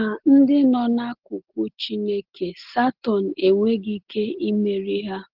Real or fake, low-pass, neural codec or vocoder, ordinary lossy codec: real; 5.4 kHz; none; Opus, 16 kbps